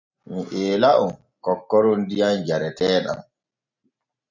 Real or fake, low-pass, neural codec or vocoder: real; 7.2 kHz; none